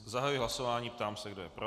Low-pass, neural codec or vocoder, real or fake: 14.4 kHz; none; real